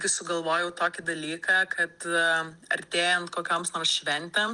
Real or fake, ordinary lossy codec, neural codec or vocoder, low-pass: real; Opus, 24 kbps; none; 10.8 kHz